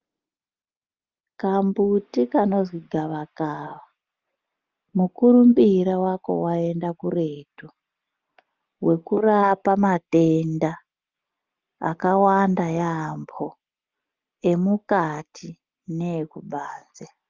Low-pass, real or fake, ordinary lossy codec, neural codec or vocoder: 7.2 kHz; real; Opus, 24 kbps; none